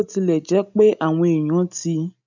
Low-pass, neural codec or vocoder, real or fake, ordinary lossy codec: 7.2 kHz; none; real; none